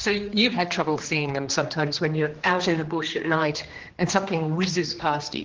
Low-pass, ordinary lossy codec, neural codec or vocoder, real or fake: 7.2 kHz; Opus, 16 kbps; codec, 16 kHz, 2 kbps, X-Codec, HuBERT features, trained on general audio; fake